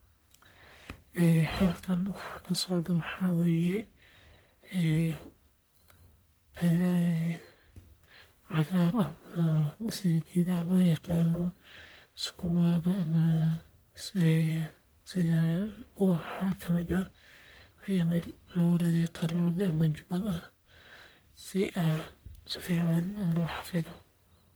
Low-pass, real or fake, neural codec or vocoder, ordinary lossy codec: none; fake; codec, 44.1 kHz, 1.7 kbps, Pupu-Codec; none